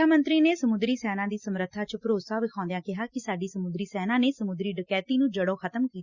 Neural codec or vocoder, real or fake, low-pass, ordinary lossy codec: none; real; 7.2 kHz; Opus, 64 kbps